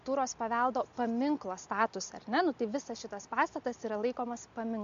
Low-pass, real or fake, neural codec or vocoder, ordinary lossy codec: 7.2 kHz; real; none; MP3, 64 kbps